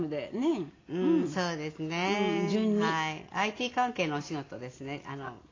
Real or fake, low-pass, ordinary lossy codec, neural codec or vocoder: real; 7.2 kHz; AAC, 32 kbps; none